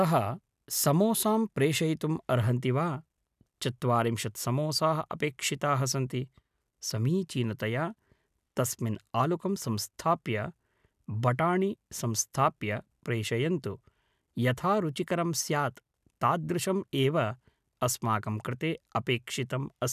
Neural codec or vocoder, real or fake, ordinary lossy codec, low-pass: none; real; none; 14.4 kHz